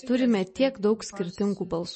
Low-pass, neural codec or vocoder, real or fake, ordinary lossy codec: 10.8 kHz; none; real; MP3, 32 kbps